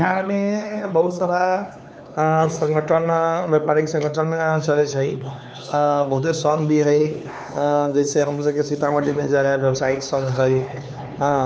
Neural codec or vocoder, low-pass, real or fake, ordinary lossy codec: codec, 16 kHz, 4 kbps, X-Codec, HuBERT features, trained on LibriSpeech; none; fake; none